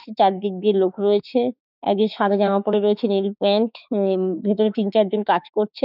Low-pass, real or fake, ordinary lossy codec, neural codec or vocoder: 5.4 kHz; fake; none; autoencoder, 48 kHz, 32 numbers a frame, DAC-VAE, trained on Japanese speech